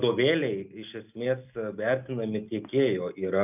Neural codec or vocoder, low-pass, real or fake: none; 3.6 kHz; real